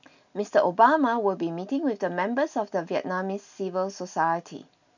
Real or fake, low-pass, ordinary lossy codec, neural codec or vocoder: real; 7.2 kHz; none; none